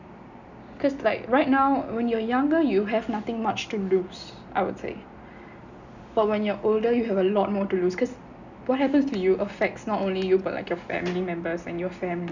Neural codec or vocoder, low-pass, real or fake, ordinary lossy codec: none; 7.2 kHz; real; none